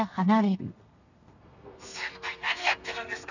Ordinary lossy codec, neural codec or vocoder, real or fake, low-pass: none; codec, 32 kHz, 1.9 kbps, SNAC; fake; 7.2 kHz